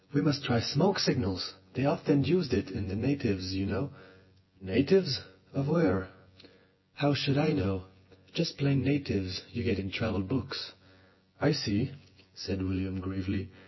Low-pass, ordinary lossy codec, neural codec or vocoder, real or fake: 7.2 kHz; MP3, 24 kbps; vocoder, 24 kHz, 100 mel bands, Vocos; fake